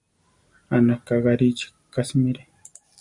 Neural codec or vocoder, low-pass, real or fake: none; 10.8 kHz; real